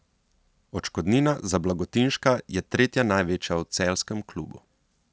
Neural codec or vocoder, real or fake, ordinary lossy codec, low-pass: none; real; none; none